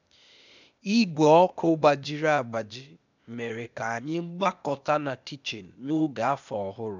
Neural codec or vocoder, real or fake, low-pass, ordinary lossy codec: codec, 16 kHz, 0.8 kbps, ZipCodec; fake; 7.2 kHz; none